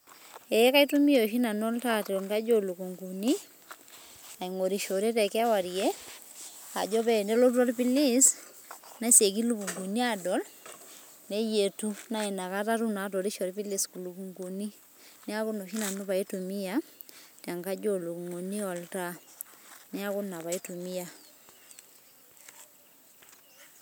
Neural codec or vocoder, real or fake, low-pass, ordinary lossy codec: none; real; none; none